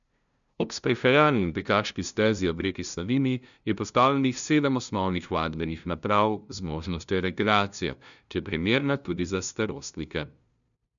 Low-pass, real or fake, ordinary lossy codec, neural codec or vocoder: 7.2 kHz; fake; none; codec, 16 kHz, 0.5 kbps, FunCodec, trained on LibriTTS, 25 frames a second